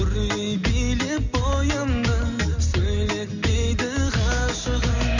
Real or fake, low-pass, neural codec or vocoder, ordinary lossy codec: real; 7.2 kHz; none; none